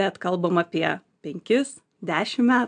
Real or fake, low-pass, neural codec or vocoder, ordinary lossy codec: real; 9.9 kHz; none; AAC, 64 kbps